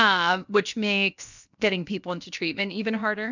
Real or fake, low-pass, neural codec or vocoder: fake; 7.2 kHz; codec, 16 kHz, 0.7 kbps, FocalCodec